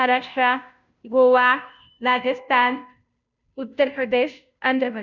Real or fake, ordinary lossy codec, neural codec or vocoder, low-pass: fake; none; codec, 16 kHz, 0.5 kbps, FunCodec, trained on Chinese and English, 25 frames a second; 7.2 kHz